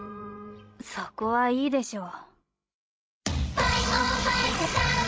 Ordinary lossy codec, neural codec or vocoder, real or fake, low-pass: none; codec, 16 kHz, 16 kbps, FreqCodec, larger model; fake; none